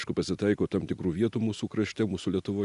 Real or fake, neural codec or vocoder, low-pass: real; none; 10.8 kHz